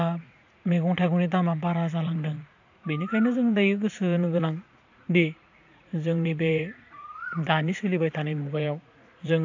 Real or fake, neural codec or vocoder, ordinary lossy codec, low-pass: fake; vocoder, 44.1 kHz, 80 mel bands, Vocos; AAC, 48 kbps; 7.2 kHz